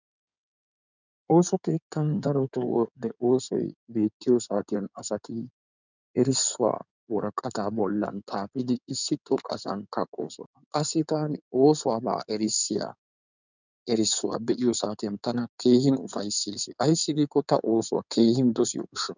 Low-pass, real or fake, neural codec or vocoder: 7.2 kHz; fake; codec, 16 kHz in and 24 kHz out, 2.2 kbps, FireRedTTS-2 codec